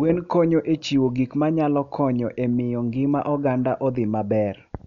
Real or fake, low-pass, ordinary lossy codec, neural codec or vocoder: real; 7.2 kHz; none; none